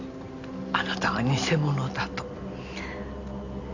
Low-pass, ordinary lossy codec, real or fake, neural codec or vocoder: 7.2 kHz; none; real; none